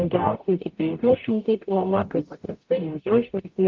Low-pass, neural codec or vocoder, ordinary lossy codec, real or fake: 7.2 kHz; codec, 44.1 kHz, 0.9 kbps, DAC; Opus, 24 kbps; fake